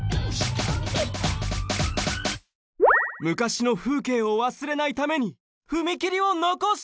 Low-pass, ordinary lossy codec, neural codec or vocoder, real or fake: none; none; none; real